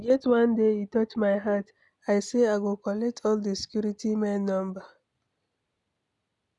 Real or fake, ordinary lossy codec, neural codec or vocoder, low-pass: real; none; none; 10.8 kHz